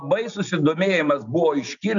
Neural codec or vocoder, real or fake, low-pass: none; real; 7.2 kHz